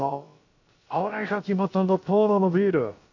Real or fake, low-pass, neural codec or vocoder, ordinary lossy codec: fake; 7.2 kHz; codec, 16 kHz, about 1 kbps, DyCAST, with the encoder's durations; MP3, 64 kbps